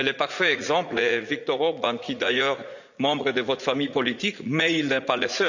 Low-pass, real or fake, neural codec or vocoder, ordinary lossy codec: 7.2 kHz; fake; vocoder, 44.1 kHz, 80 mel bands, Vocos; none